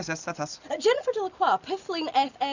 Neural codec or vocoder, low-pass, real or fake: none; 7.2 kHz; real